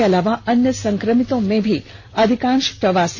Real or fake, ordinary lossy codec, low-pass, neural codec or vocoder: real; none; none; none